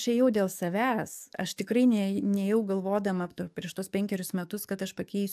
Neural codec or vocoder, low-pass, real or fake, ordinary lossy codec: codec, 44.1 kHz, 7.8 kbps, DAC; 14.4 kHz; fake; AAC, 96 kbps